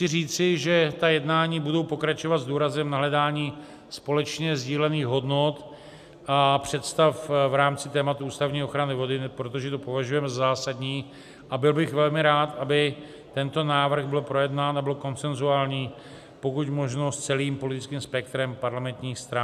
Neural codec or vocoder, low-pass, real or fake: none; 14.4 kHz; real